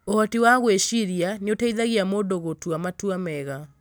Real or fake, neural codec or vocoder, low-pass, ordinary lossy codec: real; none; none; none